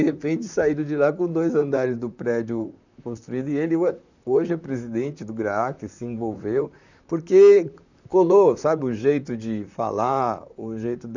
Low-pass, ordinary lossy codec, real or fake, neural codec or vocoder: 7.2 kHz; none; fake; vocoder, 44.1 kHz, 128 mel bands, Pupu-Vocoder